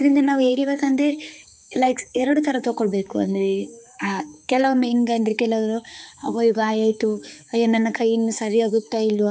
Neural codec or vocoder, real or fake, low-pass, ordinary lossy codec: codec, 16 kHz, 4 kbps, X-Codec, HuBERT features, trained on balanced general audio; fake; none; none